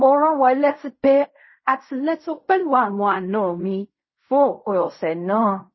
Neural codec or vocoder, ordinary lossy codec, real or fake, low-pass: codec, 16 kHz in and 24 kHz out, 0.4 kbps, LongCat-Audio-Codec, fine tuned four codebook decoder; MP3, 24 kbps; fake; 7.2 kHz